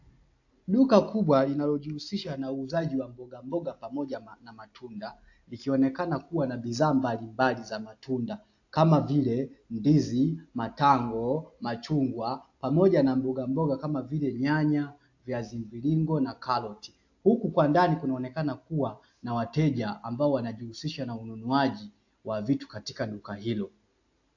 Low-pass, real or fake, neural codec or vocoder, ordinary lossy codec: 7.2 kHz; real; none; AAC, 48 kbps